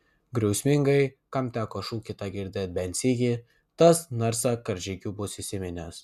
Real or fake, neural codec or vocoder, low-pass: real; none; 14.4 kHz